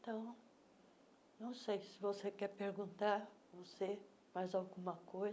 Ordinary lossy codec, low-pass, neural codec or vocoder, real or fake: none; none; none; real